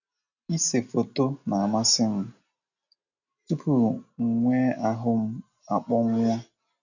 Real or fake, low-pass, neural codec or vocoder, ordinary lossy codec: real; 7.2 kHz; none; none